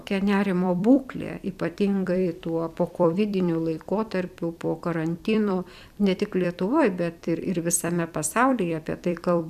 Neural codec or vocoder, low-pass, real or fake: vocoder, 44.1 kHz, 128 mel bands every 256 samples, BigVGAN v2; 14.4 kHz; fake